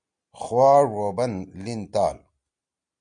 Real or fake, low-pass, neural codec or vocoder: real; 9.9 kHz; none